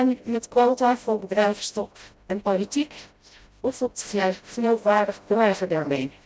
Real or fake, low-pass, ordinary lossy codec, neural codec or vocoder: fake; none; none; codec, 16 kHz, 0.5 kbps, FreqCodec, smaller model